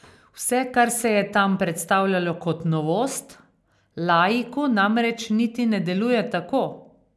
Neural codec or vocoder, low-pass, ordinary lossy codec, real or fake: none; none; none; real